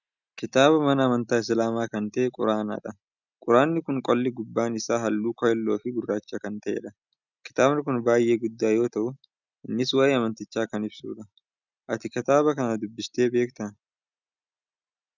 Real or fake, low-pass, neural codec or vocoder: real; 7.2 kHz; none